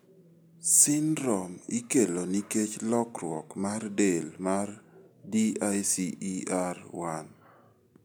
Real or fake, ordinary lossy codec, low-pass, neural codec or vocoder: real; none; none; none